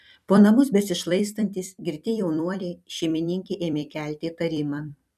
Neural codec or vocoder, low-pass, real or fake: vocoder, 44.1 kHz, 128 mel bands every 256 samples, BigVGAN v2; 14.4 kHz; fake